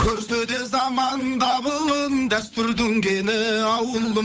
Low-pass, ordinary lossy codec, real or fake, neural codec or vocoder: none; none; fake; codec, 16 kHz, 8 kbps, FunCodec, trained on Chinese and English, 25 frames a second